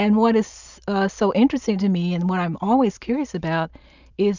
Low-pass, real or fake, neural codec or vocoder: 7.2 kHz; real; none